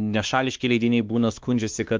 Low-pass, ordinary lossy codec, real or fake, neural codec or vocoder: 7.2 kHz; Opus, 24 kbps; fake; codec, 16 kHz, 2 kbps, X-Codec, WavLM features, trained on Multilingual LibriSpeech